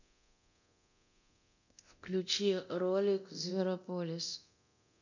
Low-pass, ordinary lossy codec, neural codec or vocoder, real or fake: 7.2 kHz; none; codec, 24 kHz, 0.9 kbps, DualCodec; fake